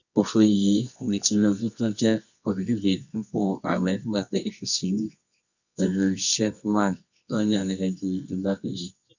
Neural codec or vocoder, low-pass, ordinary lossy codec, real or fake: codec, 24 kHz, 0.9 kbps, WavTokenizer, medium music audio release; 7.2 kHz; none; fake